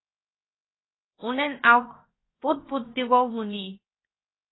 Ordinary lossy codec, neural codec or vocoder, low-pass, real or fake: AAC, 16 kbps; codec, 16 kHz, 0.7 kbps, FocalCodec; 7.2 kHz; fake